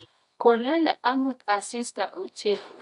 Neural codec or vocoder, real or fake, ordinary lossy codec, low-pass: codec, 24 kHz, 0.9 kbps, WavTokenizer, medium music audio release; fake; none; 10.8 kHz